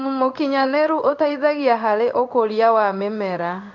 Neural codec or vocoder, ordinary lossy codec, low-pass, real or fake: codec, 16 kHz in and 24 kHz out, 1 kbps, XY-Tokenizer; none; 7.2 kHz; fake